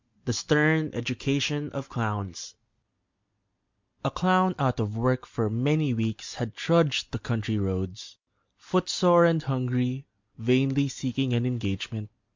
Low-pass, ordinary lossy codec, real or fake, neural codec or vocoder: 7.2 kHz; MP3, 64 kbps; real; none